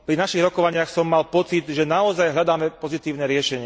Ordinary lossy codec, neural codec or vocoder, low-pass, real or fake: none; none; none; real